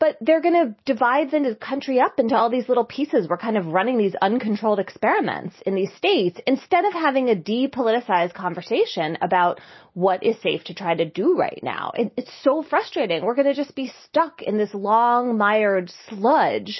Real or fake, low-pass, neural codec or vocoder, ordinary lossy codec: real; 7.2 kHz; none; MP3, 24 kbps